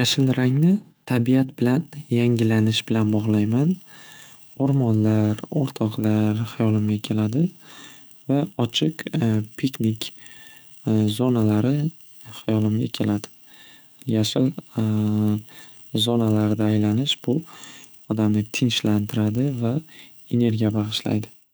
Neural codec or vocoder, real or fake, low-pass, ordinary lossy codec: autoencoder, 48 kHz, 128 numbers a frame, DAC-VAE, trained on Japanese speech; fake; none; none